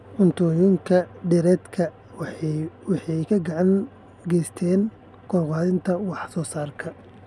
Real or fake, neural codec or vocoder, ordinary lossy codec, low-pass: real; none; none; none